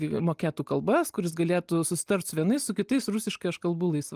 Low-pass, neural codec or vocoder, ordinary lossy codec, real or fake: 14.4 kHz; none; Opus, 32 kbps; real